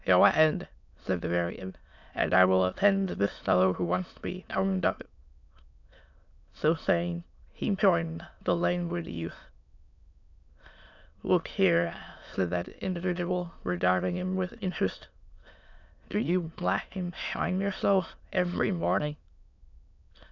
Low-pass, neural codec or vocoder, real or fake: 7.2 kHz; autoencoder, 22.05 kHz, a latent of 192 numbers a frame, VITS, trained on many speakers; fake